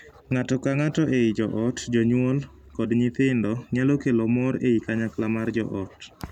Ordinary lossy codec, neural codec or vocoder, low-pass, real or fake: none; none; 19.8 kHz; real